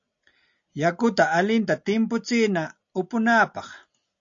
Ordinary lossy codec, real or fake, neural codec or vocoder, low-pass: AAC, 64 kbps; real; none; 7.2 kHz